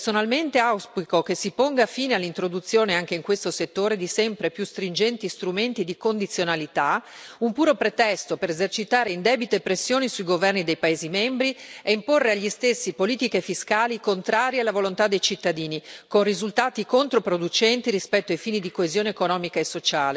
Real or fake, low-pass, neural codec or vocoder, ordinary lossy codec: real; none; none; none